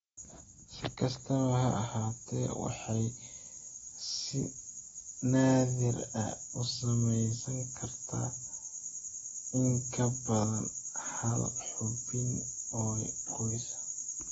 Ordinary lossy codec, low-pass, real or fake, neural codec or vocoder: AAC, 24 kbps; 19.8 kHz; real; none